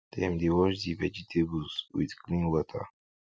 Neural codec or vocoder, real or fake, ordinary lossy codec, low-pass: none; real; none; none